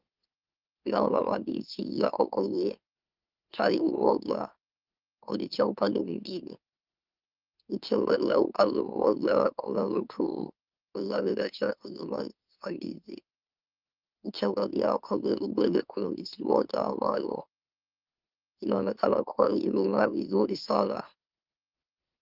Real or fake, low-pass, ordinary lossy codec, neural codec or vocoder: fake; 5.4 kHz; Opus, 32 kbps; autoencoder, 44.1 kHz, a latent of 192 numbers a frame, MeloTTS